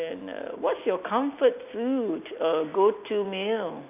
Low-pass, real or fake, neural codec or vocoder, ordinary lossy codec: 3.6 kHz; real; none; none